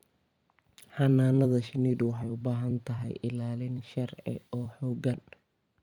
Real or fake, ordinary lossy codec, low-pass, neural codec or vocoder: fake; none; 19.8 kHz; vocoder, 44.1 kHz, 128 mel bands every 256 samples, BigVGAN v2